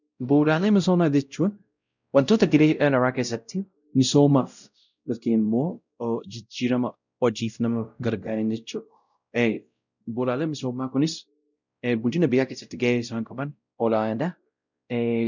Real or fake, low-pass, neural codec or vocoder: fake; 7.2 kHz; codec, 16 kHz, 0.5 kbps, X-Codec, WavLM features, trained on Multilingual LibriSpeech